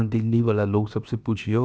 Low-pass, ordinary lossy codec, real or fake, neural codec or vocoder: none; none; fake; codec, 16 kHz, about 1 kbps, DyCAST, with the encoder's durations